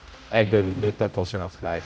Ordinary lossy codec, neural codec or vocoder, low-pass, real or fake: none; codec, 16 kHz, 0.5 kbps, X-Codec, HuBERT features, trained on general audio; none; fake